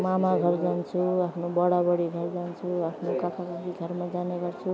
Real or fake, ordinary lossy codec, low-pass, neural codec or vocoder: real; none; none; none